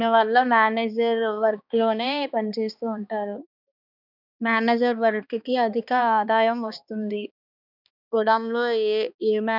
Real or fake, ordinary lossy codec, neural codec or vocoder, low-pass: fake; none; codec, 16 kHz, 2 kbps, X-Codec, HuBERT features, trained on balanced general audio; 5.4 kHz